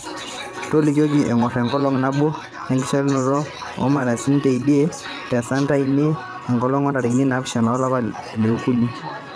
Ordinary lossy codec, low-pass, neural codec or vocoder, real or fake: none; none; vocoder, 22.05 kHz, 80 mel bands, WaveNeXt; fake